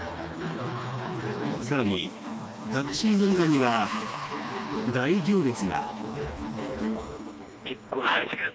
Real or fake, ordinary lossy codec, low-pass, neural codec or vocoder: fake; none; none; codec, 16 kHz, 2 kbps, FreqCodec, smaller model